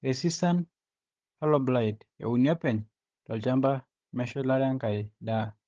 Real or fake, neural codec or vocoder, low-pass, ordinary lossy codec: fake; codec, 16 kHz, 16 kbps, FunCodec, trained on Chinese and English, 50 frames a second; 7.2 kHz; Opus, 16 kbps